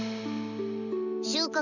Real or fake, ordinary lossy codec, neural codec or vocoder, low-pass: real; none; none; 7.2 kHz